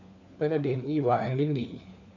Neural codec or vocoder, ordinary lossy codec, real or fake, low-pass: codec, 16 kHz, 4 kbps, FunCodec, trained on LibriTTS, 50 frames a second; none; fake; 7.2 kHz